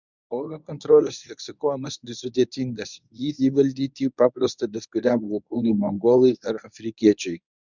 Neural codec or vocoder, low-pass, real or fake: codec, 24 kHz, 0.9 kbps, WavTokenizer, medium speech release version 1; 7.2 kHz; fake